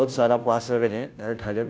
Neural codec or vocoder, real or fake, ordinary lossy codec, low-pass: codec, 16 kHz, 0.5 kbps, FunCodec, trained on Chinese and English, 25 frames a second; fake; none; none